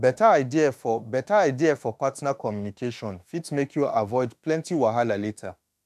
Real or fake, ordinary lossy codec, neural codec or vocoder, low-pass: fake; none; autoencoder, 48 kHz, 32 numbers a frame, DAC-VAE, trained on Japanese speech; 14.4 kHz